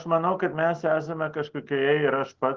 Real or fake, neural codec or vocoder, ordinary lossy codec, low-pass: real; none; Opus, 16 kbps; 7.2 kHz